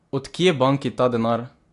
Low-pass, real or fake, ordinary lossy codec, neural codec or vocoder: 10.8 kHz; real; AAC, 48 kbps; none